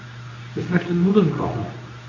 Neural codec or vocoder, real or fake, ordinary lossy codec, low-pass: codec, 24 kHz, 0.9 kbps, WavTokenizer, medium speech release version 2; fake; MP3, 48 kbps; 7.2 kHz